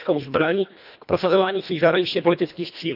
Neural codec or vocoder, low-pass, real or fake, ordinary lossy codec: codec, 24 kHz, 1.5 kbps, HILCodec; 5.4 kHz; fake; none